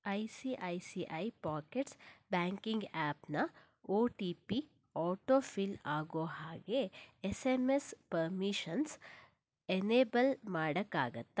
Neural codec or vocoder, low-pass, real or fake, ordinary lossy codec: none; none; real; none